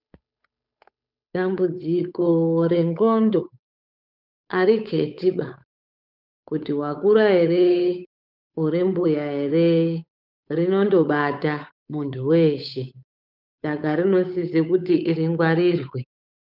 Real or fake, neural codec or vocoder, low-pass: fake; codec, 16 kHz, 8 kbps, FunCodec, trained on Chinese and English, 25 frames a second; 5.4 kHz